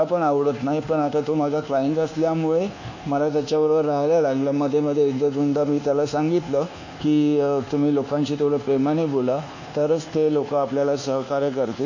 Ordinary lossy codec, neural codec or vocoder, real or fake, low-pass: none; codec, 24 kHz, 1.2 kbps, DualCodec; fake; 7.2 kHz